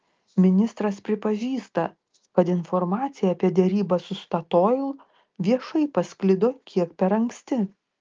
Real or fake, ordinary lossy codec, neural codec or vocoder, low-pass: real; Opus, 24 kbps; none; 7.2 kHz